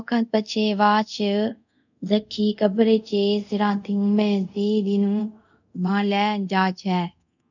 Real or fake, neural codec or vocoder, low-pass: fake; codec, 24 kHz, 0.5 kbps, DualCodec; 7.2 kHz